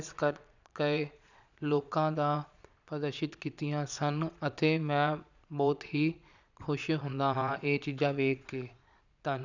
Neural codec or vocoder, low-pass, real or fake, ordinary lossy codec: vocoder, 22.05 kHz, 80 mel bands, Vocos; 7.2 kHz; fake; none